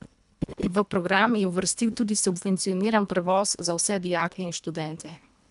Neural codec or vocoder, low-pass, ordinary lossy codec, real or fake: codec, 24 kHz, 1.5 kbps, HILCodec; 10.8 kHz; none; fake